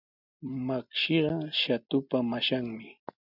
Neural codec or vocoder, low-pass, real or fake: none; 5.4 kHz; real